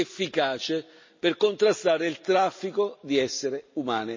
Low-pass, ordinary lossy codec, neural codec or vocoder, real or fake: 7.2 kHz; MP3, 32 kbps; none; real